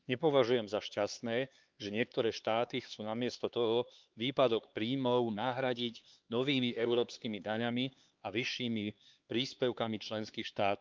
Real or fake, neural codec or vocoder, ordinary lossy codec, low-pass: fake; codec, 16 kHz, 4 kbps, X-Codec, HuBERT features, trained on LibriSpeech; Opus, 24 kbps; 7.2 kHz